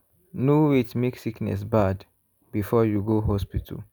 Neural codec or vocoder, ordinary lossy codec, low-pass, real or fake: none; none; none; real